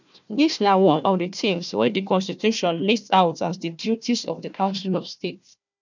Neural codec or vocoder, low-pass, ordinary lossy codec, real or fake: codec, 16 kHz, 1 kbps, FunCodec, trained on Chinese and English, 50 frames a second; 7.2 kHz; none; fake